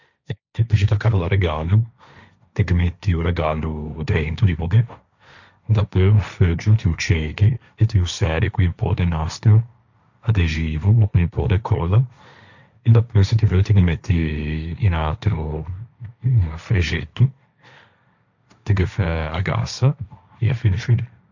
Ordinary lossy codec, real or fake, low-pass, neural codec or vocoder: none; fake; 7.2 kHz; codec, 16 kHz, 1.1 kbps, Voila-Tokenizer